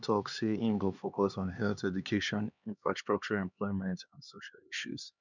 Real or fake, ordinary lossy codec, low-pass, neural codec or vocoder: fake; none; 7.2 kHz; codec, 16 kHz, 2 kbps, X-Codec, HuBERT features, trained on LibriSpeech